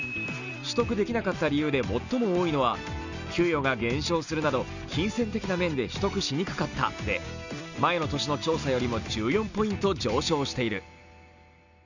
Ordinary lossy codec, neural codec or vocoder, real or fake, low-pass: none; none; real; 7.2 kHz